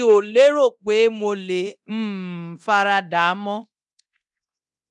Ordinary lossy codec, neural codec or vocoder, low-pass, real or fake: none; codec, 24 kHz, 0.9 kbps, DualCodec; 10.8 kHz; fake